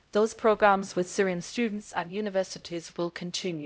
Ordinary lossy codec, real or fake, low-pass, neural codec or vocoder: none; fake; none; codec, 16 kHz, 0.5 kbps, X-Codec, HuBERT features, trained on LibriSpeech